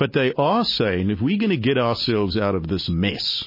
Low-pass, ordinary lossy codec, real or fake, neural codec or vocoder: 5.4 kHz; MP3, 24 kbps; real; none